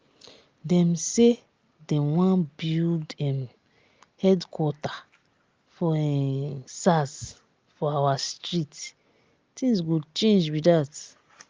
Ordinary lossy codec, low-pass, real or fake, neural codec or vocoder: Opus, 24 kbps; 7.2 kHz; real; none